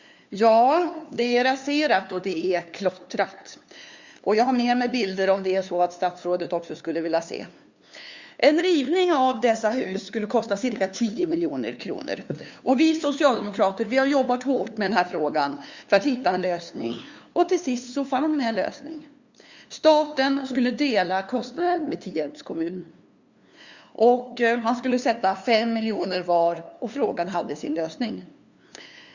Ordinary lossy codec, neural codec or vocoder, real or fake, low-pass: Opus, 64 kbps; codec, 16 kHz, 2 kbps, FunCodec, trained on LibriTTS, 25 frames a second; fake; 7.2 kHz